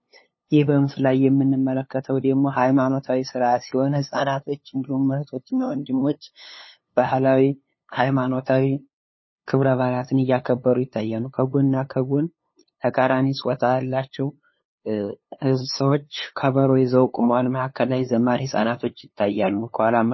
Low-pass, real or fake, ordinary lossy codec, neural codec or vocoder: 7.2 kHz; fake; MP3, 24 kbps; codec, 16 kHz, 2 kbps, FunCodec, trained on LibriTTS, 25 frames a second